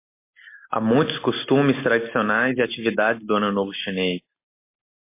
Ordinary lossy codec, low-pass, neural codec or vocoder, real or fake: MP3, 24 kbps; 3.6 kHz; none; real